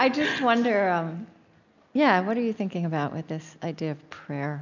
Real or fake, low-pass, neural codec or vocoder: real; 7.2 kHz; none